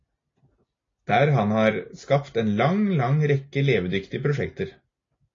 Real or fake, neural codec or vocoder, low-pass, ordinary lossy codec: real; none; 7.2 kHz; AAC, 32 kbps